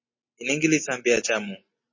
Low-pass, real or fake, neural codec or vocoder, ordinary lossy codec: 7.2 kHz; real; none; MP3, 32 kbps